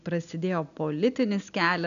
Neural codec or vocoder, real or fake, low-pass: none; real; 7.2 kHz